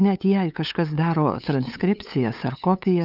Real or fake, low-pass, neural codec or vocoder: fake; 5.4 kHz; codec, 16 kHz, 8 kbps, FunCodec, trained on LibriTTS, 25 frames a second